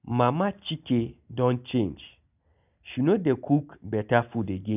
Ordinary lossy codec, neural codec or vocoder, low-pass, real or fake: none; none; 3.6 kHz; real